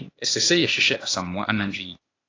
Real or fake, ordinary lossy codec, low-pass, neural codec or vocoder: fake; AAC, 32 kbps; 7.2 kHz; codec, 16 kHz, 0.8 kbps, ZipCodec